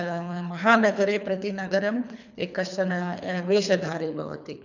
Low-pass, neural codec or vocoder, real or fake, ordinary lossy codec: 7.2 kHz; codec, 24 kHz, 3 kbps, HILCodec; fake; none